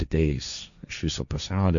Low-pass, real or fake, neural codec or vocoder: 7.2 kHz; fake; codec, 16 kHz, 1.1 kbps, Voila-Tokenizer